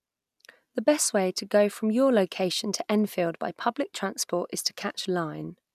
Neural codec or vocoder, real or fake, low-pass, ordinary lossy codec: none; real; 14.4 kHz; none